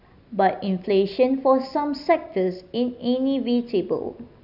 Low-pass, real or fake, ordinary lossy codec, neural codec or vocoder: 5.4 kHz; real; none; none